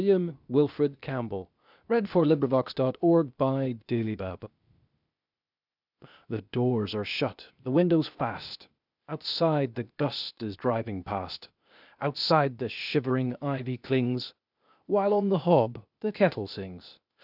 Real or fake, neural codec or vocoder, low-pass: fake; codec, 16 kHz, 0.8 kbps, ZipCodec; 5.4 kHz